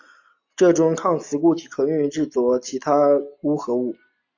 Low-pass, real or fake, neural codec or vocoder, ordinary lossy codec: 7.2 kHz; real; none; AAC, 48 kbps